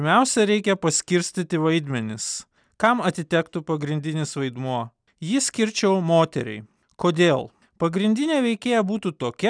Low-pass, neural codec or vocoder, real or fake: 9.9 kHz; none; real